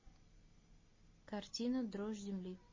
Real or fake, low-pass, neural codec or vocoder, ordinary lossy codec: real; 7.2 kHz; none; MP3, 32 kbps